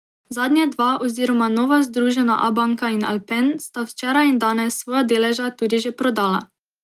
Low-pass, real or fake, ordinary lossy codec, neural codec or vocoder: 14.4 kHz; real; Opus, 32 kbps; none